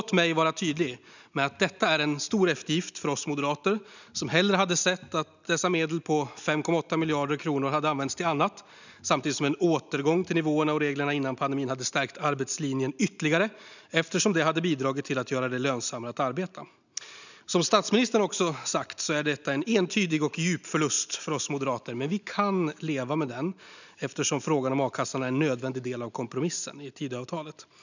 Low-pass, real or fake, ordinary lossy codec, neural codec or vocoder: 7.2 kHz; real; none; none